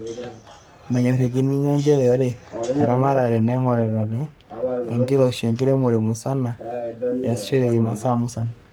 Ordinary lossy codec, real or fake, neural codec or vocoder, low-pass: none; fake; codec, 44.1 kHz, 3.4 kbps, Pupu-Codec; none